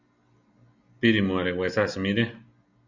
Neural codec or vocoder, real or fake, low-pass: none; real; 7.2 kHz